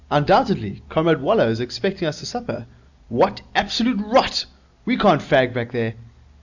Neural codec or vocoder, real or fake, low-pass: none; real; 7.2 kHz